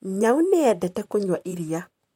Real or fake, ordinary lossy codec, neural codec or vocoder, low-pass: fake; MP3, 64 kbps; vocoder, 44.1 kHz, 128 mel bands, Pupu-Vocoder; 19.8 kHz